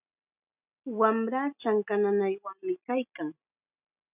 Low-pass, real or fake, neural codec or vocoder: 3.6 kHz; real; none